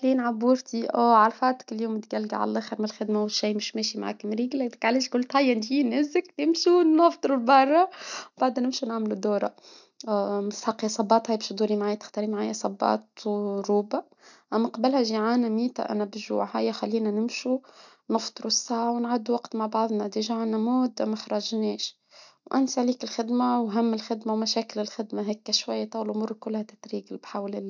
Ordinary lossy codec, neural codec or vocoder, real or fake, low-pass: none; none; real; 7.2 kHz